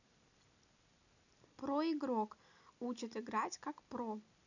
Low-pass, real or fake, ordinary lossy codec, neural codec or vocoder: 7.2 kHz; real; none; none